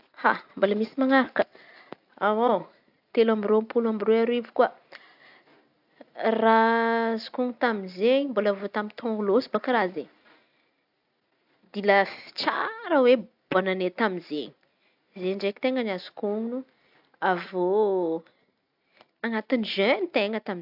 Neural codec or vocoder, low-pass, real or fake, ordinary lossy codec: none; 5.4 kHz; real; AAC, 48 kbps